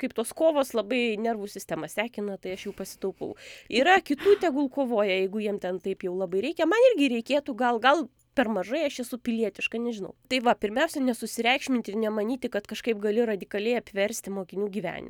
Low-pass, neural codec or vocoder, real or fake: 19.8 kHz; none; real